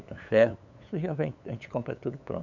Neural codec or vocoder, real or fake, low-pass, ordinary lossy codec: codec, 16 kHz, 8 kbps, FunCodec, trained on LibriTTS, 25 frames a second; fake; 7.2 kHz; none